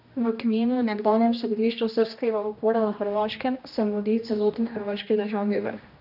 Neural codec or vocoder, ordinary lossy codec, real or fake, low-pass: codec, 16 kHz, 1 kbps, X-Codec, HuBERT features, trained on general audio; none; fake; 5.4 kHz